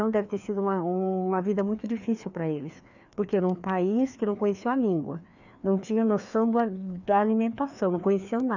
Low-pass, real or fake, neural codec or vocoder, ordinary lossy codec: 7.2 kHz; fake; codec, 16 kHz, 2 kbps, FreqCodec, larger model; none